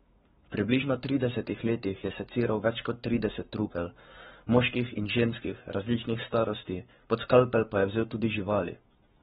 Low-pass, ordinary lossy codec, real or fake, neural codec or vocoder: 19.8 kHz; AAC, 16 kbps; fake; codec, 44.1 kHz, 7.8 kbps, Pupu-Codec